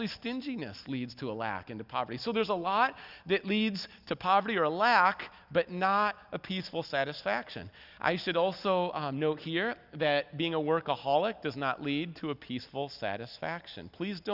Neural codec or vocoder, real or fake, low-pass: none; real; 5.4 kHz